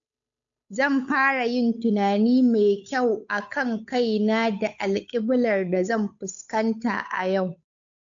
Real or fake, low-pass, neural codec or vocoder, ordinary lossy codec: fake; 7.2 kHz; codec, 16 kHz, 8 kbps, FunCodec, trained on Chinese and English, 25 frames a second; AAC, 64 kbps